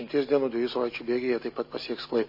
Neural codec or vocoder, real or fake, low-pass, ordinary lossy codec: none; real; 5.4 kHz; MP3, 24 kbps